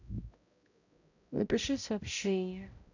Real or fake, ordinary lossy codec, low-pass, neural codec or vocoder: fake; none; 7.2 kHz; codec, 16 kHz, 0.5 kbps, X-Codec, HuBERT features, trained on balanced general audio